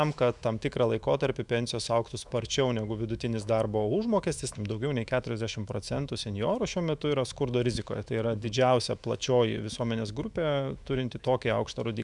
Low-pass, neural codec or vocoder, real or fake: 10.8 kHz; autoencoder, 48 kHz, 128 numbers a frame, DAC-VAE, trained on Japanese speech; fake